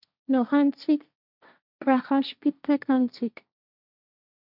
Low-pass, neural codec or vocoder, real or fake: 5.4 kHz; codec, 16 kHz, 1.1 kbps, Voila-Tokenizer; fake